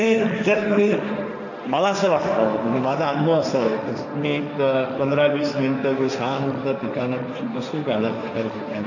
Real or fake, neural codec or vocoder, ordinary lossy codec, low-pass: fake; codec, 16 kHz, 1.1 kbps, Voila-Tokenizer; none; 7.2 kHz